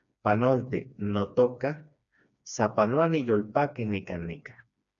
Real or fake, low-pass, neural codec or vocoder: fake; 7.2 kHz; codec, 16 kHz, 2 kbps, FreqCodec, smaller model